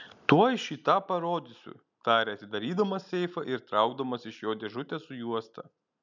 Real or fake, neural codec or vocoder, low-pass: real; none; 7.2 kHz